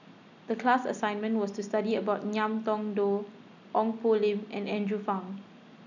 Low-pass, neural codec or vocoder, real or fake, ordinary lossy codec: 7.2 kHz; none; real; none